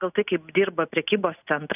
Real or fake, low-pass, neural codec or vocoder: real; 3.6 kHz; none